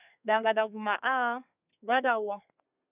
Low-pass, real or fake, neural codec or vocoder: 3.6 kHz; fake; codec, 32 kHz, 1.9 kbps, SNAC